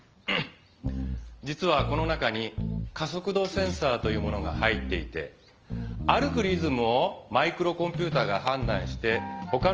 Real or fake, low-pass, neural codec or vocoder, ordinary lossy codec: real; 7.2 kHz; none; Opus, 24 kbps